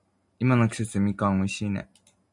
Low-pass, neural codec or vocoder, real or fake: 10.8 kHz; none; real